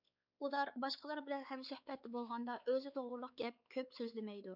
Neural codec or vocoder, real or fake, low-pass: codec, 16 kHz, 4 kbps, X-Codec, WavLM features, trained on Multilingual LibriSpeech; fake; 5.4 kHz